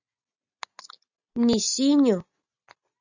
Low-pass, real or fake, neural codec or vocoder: 7.2 kHz; real; none